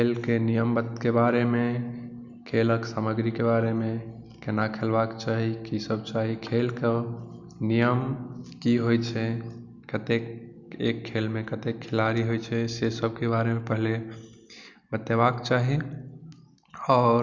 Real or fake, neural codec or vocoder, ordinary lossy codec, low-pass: real; none; none; 7.2 kHz